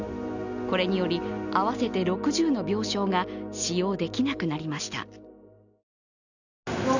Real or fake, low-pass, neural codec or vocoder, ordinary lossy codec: real; 7.2 kHz; none; none